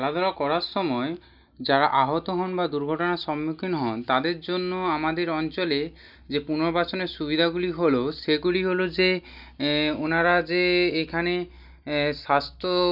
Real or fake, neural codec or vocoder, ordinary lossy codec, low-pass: real; none; none; 5.4 kHz